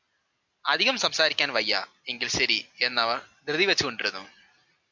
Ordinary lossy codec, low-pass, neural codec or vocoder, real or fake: MP3, 64 kbps; 7.2 kHz; none; real